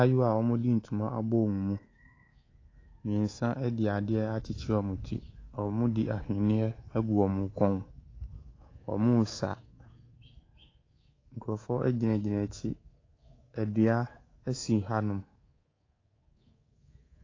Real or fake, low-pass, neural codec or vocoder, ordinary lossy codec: fake; 7.2 kHz; codec, 24 kHz, 3.1 kbps, DualCodec; AAC, 32 kbps